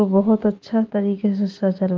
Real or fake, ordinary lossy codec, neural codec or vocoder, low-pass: real; Opus, 32 kbps; none; 7.2 kHz